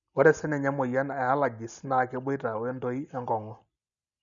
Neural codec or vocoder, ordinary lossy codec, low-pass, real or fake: none; none; 7.2 kHz; real